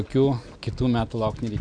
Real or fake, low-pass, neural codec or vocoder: real; 9.9 kHz; none